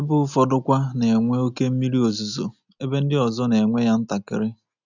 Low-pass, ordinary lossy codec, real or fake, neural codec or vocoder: 7.2 kHz; none; real; none